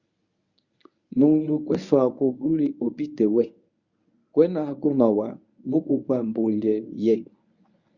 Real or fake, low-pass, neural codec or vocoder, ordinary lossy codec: fake; 7.2 kHz; codec, 24 kHz, 0.9 kbps, WavTokenizer, medium speech release version 1; Opus, 64 kbps